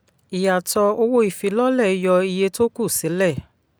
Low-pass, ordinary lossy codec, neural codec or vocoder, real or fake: none; none; none; real